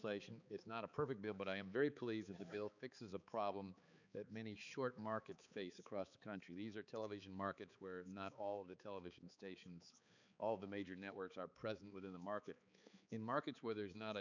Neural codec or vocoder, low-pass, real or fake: codec, 16 kHz, 4 kbps, X-Codec, HuBERT features, trained on balanced general audio; 7.2 kHz; fake